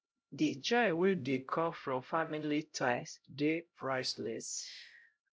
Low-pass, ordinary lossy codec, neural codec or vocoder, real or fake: none; none; codec, 16 kHz, 0.5 kbps, X-Codec, HuBERT features, trained on LibriSpeech; fake